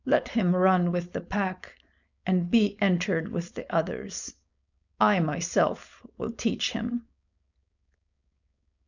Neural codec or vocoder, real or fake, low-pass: codec, 16 kHz, 4.8 kbps, FACodec; fake; 7.2 kHz